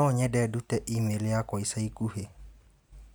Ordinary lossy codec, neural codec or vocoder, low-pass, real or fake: none; none; none; real